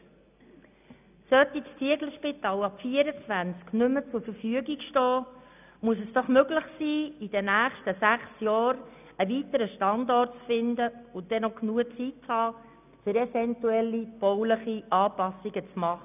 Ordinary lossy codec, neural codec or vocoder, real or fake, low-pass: none; none; real; 3.6 kHz